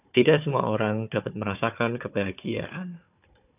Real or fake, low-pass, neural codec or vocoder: fake; 3.6 kHz; codec, 16 kHz, 4 kbps, FunCodec, trained on Chinese and English, 50 frames a second